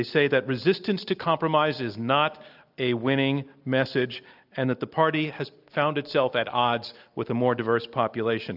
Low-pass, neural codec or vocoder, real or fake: 5.4 kHz; none; real